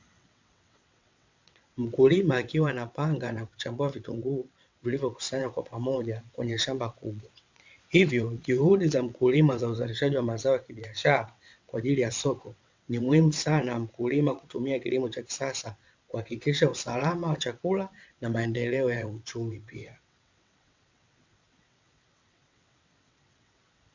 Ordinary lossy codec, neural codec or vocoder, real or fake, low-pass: MP3, 64 kbps; vocoder, 22.05 kHz, 80 mel bands, WaveNeXt; fake; 7.2 kHz